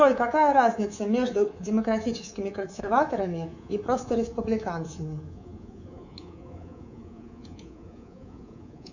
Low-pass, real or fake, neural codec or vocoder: 7.2 kHz; fake; codec, 24 kHz, 3.1 kbps, DualCodec